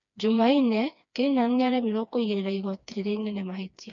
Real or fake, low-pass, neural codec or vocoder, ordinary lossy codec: fake; 7.2 kHz; codec, 16 kHz, 2 kbps, FreqCodec, smaller model; none